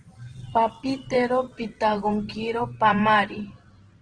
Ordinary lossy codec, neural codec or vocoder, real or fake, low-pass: Opus, 16 kbps; vocoder, 44.1 kHz, 128 mel bands every 512 samples, BigVGAN v2; fake; 9.9 kHz